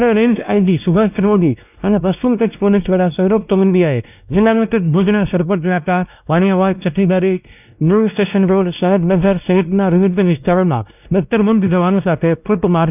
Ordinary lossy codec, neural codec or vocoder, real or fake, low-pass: none; codec, 16 kHz, 1 kbps, X-Codec, WavLM features, trained on Multilingual LibriSpeech; fake; 3.6 kHz